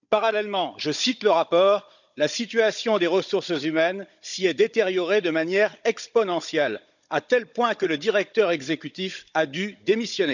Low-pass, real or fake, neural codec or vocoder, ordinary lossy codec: 7.2 kHz; fake; codec, 16 kHz, 16 kbps, FunCodec, trained on Chinese and English, 50 frames a second; none